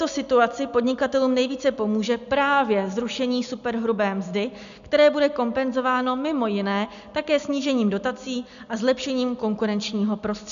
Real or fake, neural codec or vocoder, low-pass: real; none; 7.2 kHz